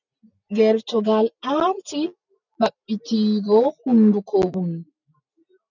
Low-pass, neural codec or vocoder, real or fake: 7.2 kHz; none; real